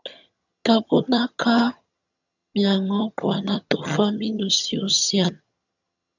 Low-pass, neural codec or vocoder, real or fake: 7.2 kHz; vocoder, 22.05 kHz, 80 mel bands, HiFi-GAN; fake